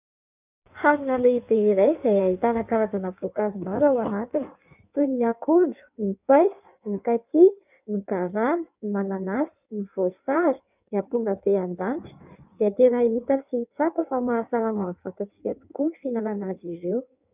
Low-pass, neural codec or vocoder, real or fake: 3.6 kHz; codec, 16 kHz in and 24 kHz out, 1.1 kbps, FireRedTTS-2 codec; fake